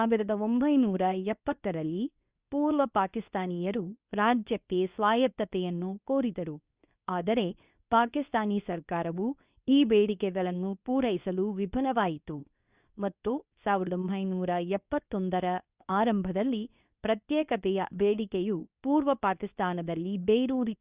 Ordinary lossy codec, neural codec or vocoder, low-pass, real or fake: Opus, 64 kbps; codec, 24 kHz, 0.9 kbps, WavTokenizer, medium speech release version 2; 3.6 kHz; fake